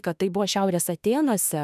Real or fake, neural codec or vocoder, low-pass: fake; autoencoder, 48 kHz, 32 numbers a frame, DAC-VAE, trained on Japanese speech; 14.4 kHz